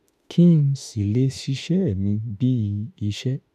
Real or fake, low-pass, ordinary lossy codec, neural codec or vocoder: fake; 14.4 kHz; none; autoencoder, 48 kHz, 32 numbers a frame, DAC-VAE, trained on Japanese speech